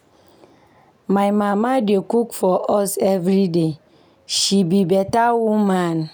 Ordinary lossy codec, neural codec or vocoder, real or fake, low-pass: none; none; real; none